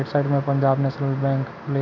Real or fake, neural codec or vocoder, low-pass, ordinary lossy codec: real; none; 7.2 kHz; none